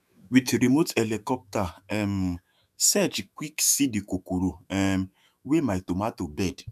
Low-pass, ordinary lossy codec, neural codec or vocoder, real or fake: 14.4 kHz; none; autoencoder, 48 kHz, 128 numbers a frame, DAC-VAE, trained on Japanese speech; fake